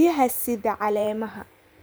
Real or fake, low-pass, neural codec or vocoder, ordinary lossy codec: fake; none; vocoder, 44.1 kHz, 128 mel bands every 512 samples, BigVGAN v2; none